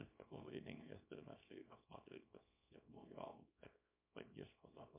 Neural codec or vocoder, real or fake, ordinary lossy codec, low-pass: codec, 24 kHz, 0.9 kbps, WavTokenizer, small release; fake; MP3, 32 kbps; 3.6 kHz